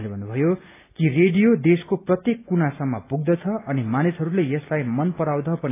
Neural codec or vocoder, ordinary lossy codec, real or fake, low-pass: none; AAC, 24 kbps; real; 3.6 kHz